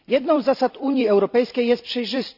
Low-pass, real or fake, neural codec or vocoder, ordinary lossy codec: 5.4 kHz; fake; vocoder, 44.1 kHz, 128 mel bands every 256 samples, BigVGAN v2; none